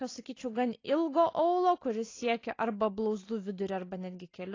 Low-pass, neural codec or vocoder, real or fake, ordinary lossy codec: 7.2 kHz; none; real; AAC, 32 kbps